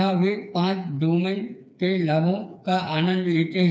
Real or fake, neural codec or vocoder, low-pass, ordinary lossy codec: fake; codec, 16 kHz, 4 kbps, FreqCodec, smaller model; none; none